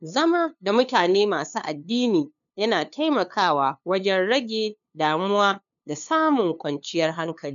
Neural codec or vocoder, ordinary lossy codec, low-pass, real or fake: codec, 16 kHz, 2 kbps, FunCodec, trained on LibriTTS, 25 frames a second; none; 7.2 kHz; fake